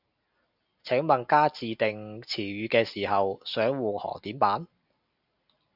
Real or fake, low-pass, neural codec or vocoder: real; 5.4 kHz; none